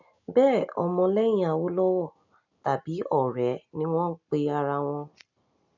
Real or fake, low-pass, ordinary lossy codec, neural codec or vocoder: real; 7.2 kHz; none; none